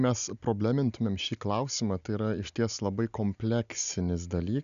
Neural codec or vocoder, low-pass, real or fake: none; 7.2 kHz; real